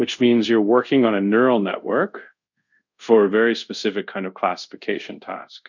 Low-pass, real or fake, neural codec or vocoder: 7.2 kHz; fake; codec, 24 kHz, 0.5 kbps, DualCodec